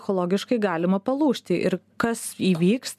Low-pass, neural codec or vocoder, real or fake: 14.4 kHz; none; real